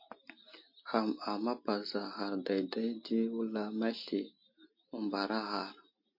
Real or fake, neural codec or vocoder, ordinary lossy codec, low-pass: real; none; MP3, 32 kbps; 5.4 kHz